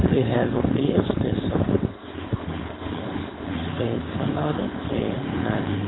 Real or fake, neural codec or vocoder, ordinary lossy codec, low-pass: fake; codec, 16 kHz, 4.8 kbps, FACodec; AAC, 16 kbps; 7.2 kHz